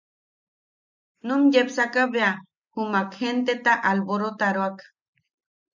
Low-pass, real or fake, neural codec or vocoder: 7.2 kHz; real; none